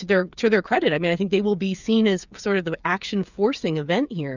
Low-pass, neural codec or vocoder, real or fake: 7.2 kHz; codec, 16 kHz, 8 kbps, FreqCodec, smaller model; fake